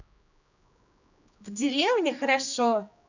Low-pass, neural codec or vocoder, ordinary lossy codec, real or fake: 7.2 kHz; codec, 16 kHz, 2 kbps, X-Codec, HuBERT features, trained on general audio; none; fake